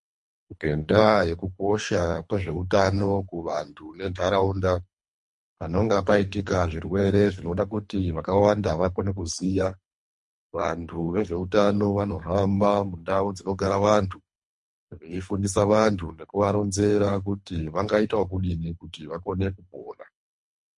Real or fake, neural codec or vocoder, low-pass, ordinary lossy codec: fake; codec, 24 kHz, 3 kbps, HILCodec; 10.8 kHz; MP3, 48 kbps